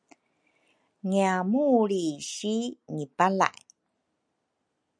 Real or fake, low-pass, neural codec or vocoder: real; 9.9 kHz; none